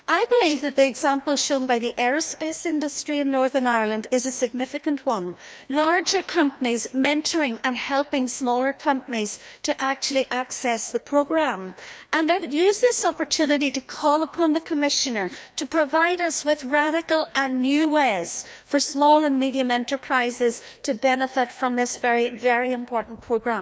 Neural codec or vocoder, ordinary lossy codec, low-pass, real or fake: codec, 16 kHz, 1 kbps, FreqCodec, larger model; none; none; fake